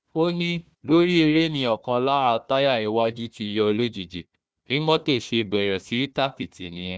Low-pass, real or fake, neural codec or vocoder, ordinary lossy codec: none; fake; codec, 16 kHz, 1 kbps, FunCodec, trained on Chinese and English, 50 frames a second; none